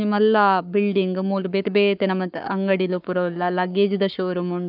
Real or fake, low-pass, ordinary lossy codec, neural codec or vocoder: fake; 5.4 kHz; none; codec, 44.1 kHz, 7.8 kbps, Pupu-Codec